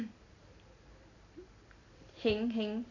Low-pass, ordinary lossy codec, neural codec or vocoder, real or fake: 7.2 kHz; none; none; real